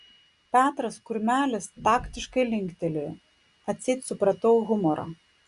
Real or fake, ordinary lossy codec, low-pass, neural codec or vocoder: real; Opus, 64 kbps; 10.8 kHz; none